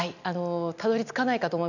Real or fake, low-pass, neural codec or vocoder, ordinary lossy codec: real; 7.2 kHz; none; none